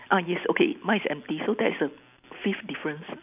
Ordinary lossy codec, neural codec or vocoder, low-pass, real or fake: none; none; 3.6 kHz; real